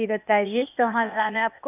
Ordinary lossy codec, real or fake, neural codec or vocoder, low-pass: none; fake; codec, 16 kHz, 0.8 kbps, ZipCodec; 3.6 kHz